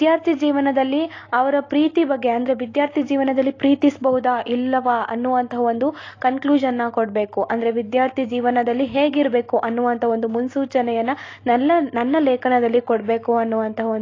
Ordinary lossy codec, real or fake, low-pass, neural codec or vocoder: AAC, 32 kbps; real; 7.2 kHz; none